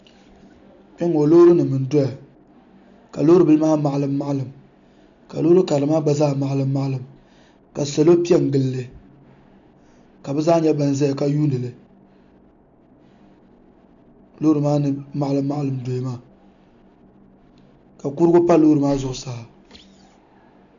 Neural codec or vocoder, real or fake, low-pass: none; real; 7.2 kHz